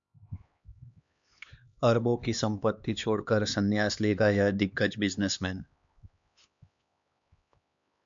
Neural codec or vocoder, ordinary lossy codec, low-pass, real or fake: codec, 16 kHz, 2 kbps, X-Codec, HuBERT features, trained on LibriSpeech; MP3, 64 kbps; 7.2 kHz; fake